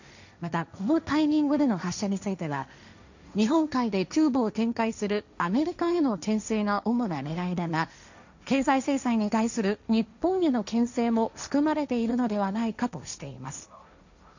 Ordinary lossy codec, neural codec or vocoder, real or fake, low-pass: none; codec, 16 kHz, 1.1 kbps, Voila-Tokenizer; fake; 7.2 kHz